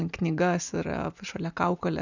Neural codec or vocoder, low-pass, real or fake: none; 7.2 kHz; real